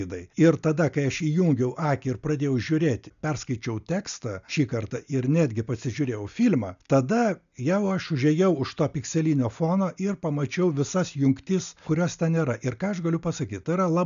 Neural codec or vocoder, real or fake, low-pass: none; real; 7.2 kHz